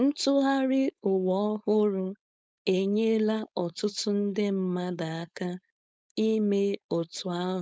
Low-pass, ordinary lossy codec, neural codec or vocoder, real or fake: none; none; codec, 16 kHz, 4.8 kbps, FACodec; fake